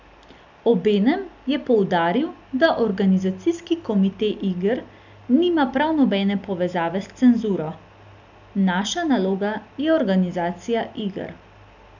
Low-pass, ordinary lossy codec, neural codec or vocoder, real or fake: 7.2 kHz; none; none; real